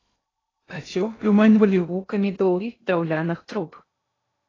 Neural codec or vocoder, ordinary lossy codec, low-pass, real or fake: codec, 16 kHz in and 24 kHz out, 0.6 kbps, FocalCodec, streaming, 2048 codes; AAC, 32 kbps; 7.2 kHz; fake